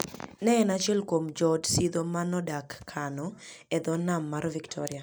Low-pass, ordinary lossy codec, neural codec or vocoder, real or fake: none; none; none; real